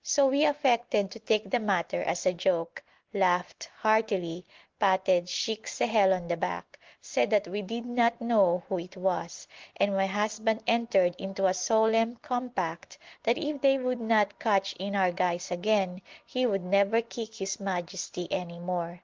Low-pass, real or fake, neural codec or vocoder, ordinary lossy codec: 7.2 kHz; real; none; Opus, 16 kbps